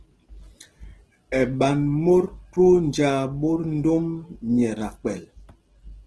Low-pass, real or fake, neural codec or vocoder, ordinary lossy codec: 10.8 kHz; real; none; Opus, 16 kbps